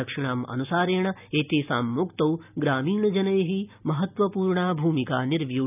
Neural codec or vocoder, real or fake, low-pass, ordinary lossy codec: none; real; 3.6 kHz; none